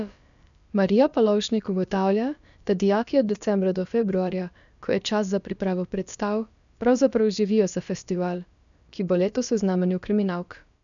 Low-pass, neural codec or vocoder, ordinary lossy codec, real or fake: 7.2 kHz; codec, 16 kHz, about 1 kbps, DyCAST, with the encoder's durations; none; fake